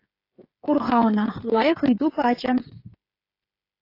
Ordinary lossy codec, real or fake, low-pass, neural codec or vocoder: AAC, 32 kbps; fake; 5.4 kHz; codec, 16 kHz, 8 kbps, FreqCodec, smaller model